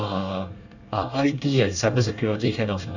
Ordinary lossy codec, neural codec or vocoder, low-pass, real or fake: none; codec, 24 kHz, 1 kbps, SNAC; 7.2 kHz; fake